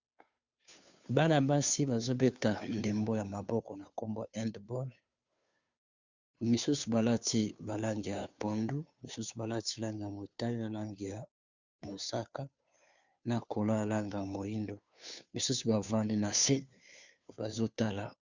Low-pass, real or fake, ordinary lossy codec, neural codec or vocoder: 7.2 kHz; fake; Opus, 64 kbps; codec, 16 kHz, 2 kbps, FunCodec, trained on Chinese and English, 25 frames a second